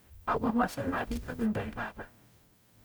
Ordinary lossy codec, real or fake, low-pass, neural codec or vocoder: none; fake; none; codec, 44.1 kHz, 0.9 kbps, DAC